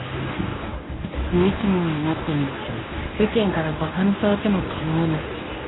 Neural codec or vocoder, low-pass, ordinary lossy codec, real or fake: codec, 24 kHz, 0.9 kbps, WavTokenizer, medium speech release version 1; 7.2 kHz; AAC, 16 kbps; fake